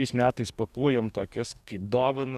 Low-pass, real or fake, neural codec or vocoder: 14.4 kHz; fake; codec, 44.1 kHz, 2.6 kbps, DAC